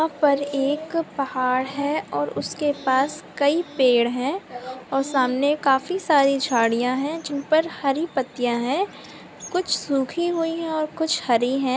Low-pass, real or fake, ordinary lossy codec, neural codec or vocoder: none; real; none; none